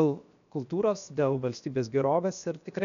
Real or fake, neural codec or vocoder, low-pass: fake; codec, 16 kHz, 0.7 kbps, FocalCodec; 7.2 kHz